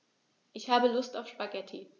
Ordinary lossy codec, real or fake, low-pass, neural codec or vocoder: none; real; 7.2 kHz; none